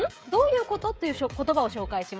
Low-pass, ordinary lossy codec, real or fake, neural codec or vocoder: none; none; fake; codec, 16 kHz, 16 kbps, FreqCodec, smaller model